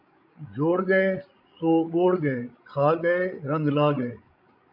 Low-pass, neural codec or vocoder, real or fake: 5.4 kHz; codec, 16 kHz, 16 kbps, FreqCodec, larger model; fake